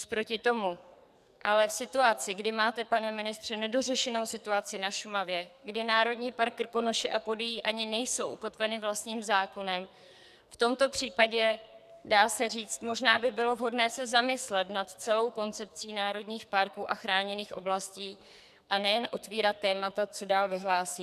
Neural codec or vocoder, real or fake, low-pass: codec, 44.1 kHz, 2.6 kbps, SNAC; fake; 14.4 kHz